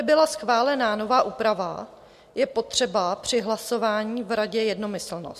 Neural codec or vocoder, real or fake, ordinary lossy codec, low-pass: none; real; MP3, 64 kbps; 14.4 kHz